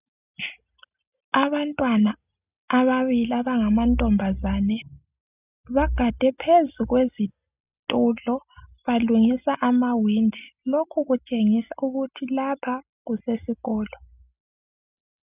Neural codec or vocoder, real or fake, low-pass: none; real; 3.6 kHz